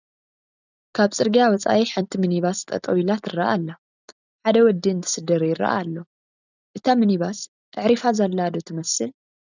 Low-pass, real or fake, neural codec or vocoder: 7.2 kHz; real; none